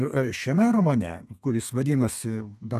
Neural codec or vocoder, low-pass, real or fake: codec, 32 kHz, 1.9 kbps, SNAC; 14.4 kHz; fake